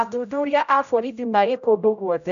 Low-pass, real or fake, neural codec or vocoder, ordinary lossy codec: 7.2 kHz; fake; codec, 16 kHz, 0.5 kbps, X-Codec, HuBERT features, trained on general audio; none